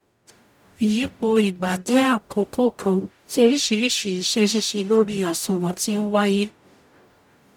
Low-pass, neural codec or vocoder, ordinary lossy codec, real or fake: 19.8 kHz; codec, 44.1 kHz, 0.9 kbps, DAC; none; fake